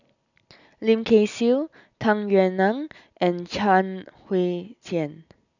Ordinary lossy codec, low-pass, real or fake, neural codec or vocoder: none; 7.2 kHz; real; none